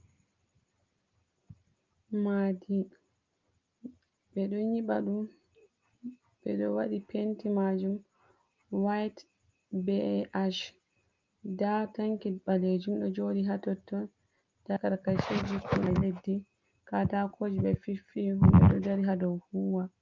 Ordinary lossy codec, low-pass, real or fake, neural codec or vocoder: Opus, 24 kbps; 7.2 kHz; real; none